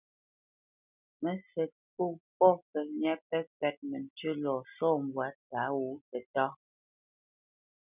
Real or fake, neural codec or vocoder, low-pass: real; none; 3.6 kHz